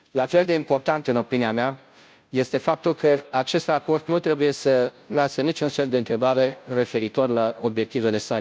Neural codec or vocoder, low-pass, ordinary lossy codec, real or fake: codec, 16 kHz, 0.5 kbps, FunCodec, trained on Chinese and English, 25 frames a second; none; none; fake